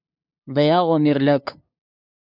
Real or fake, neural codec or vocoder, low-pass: fake; codec, 16 kHz, 2 kbps, FunCodec, trained on LibriTTS, 25 frames a second; 5.4 kHz